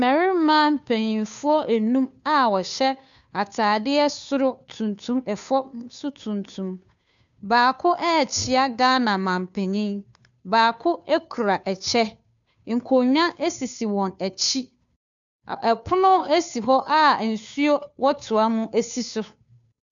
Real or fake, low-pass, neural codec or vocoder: fake; 7.2 kHz; codec, 16 kHz, 2 kbps, FunCodec, trained on Chinese and English, 25 frames a second